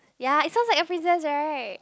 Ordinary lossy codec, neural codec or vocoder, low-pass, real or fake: none; none; none; real